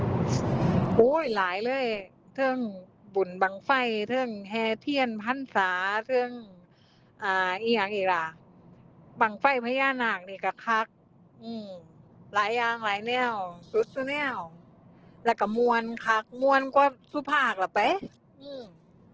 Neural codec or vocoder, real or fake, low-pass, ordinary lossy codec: none; real; 7.2 kHz; Opus, 16 kbps